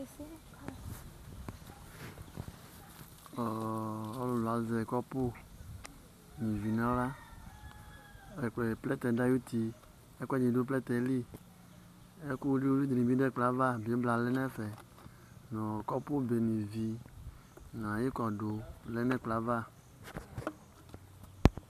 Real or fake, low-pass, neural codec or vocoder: real; 14.4 kHz; none